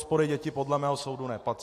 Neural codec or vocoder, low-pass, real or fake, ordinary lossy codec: none; 14.4 kHz; real; AAC, 64 kbps